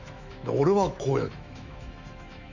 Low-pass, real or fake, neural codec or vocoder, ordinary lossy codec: 7.2 kHz; fake; autoencoder, 48 kHz, 128 numbers a frame, DAC-VAE, trained on Japanese speech; none